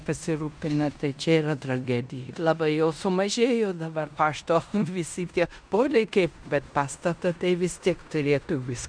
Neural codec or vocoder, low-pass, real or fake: codec, 16 kHz in and 24 kHz out, 0.9 kbps, LongCat-Audio-Codec, fine tuned four codebook decoder; 9.9 kHz; fake